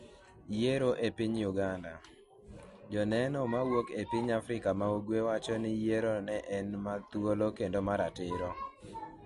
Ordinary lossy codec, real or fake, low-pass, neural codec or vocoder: MP3, 48 kbps; real; 14.4 kHz; none